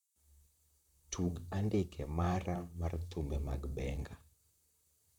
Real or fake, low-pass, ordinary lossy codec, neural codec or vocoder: fake; 19.8 kHz; none; vocoder, 44.1 kHz, 128 mel bands, Pupu-Vocoder